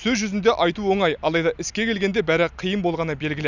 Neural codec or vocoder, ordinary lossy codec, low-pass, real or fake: none; none; 7.2 kHz; real